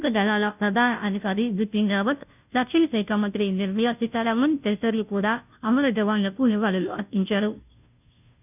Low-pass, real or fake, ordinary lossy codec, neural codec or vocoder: 3.6 kHz; fake; none; codec, 16 kHz, 0.5 kbps, FunCodec, trained on Chinese and English, 25 frames a second